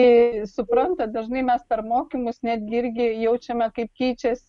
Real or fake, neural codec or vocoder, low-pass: real; none; 10.8 kHz